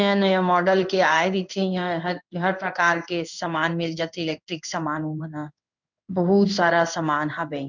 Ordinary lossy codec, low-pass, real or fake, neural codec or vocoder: none; 7.2 kHz; fake; codec, 16 kHz in and 24 kHz out, 1 kbps, XY-Tokenizer